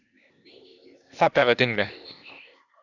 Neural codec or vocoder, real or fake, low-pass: codec, 16 kHz, 0.8 kbps, ZipCodec; fake; 7.2 kHz